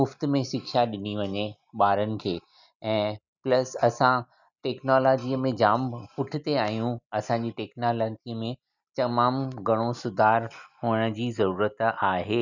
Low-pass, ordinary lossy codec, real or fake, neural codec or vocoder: 7.2 kHz; none; real; none